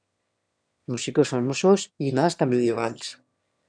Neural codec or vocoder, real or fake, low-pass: autoencoder, 22.05 kHz, a latent of 192 numbers a frame, VITS, trained on one speaker; fake; 9.9 kHz